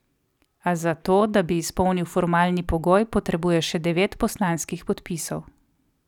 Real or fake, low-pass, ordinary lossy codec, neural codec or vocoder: real; 19.8 kHz; none; none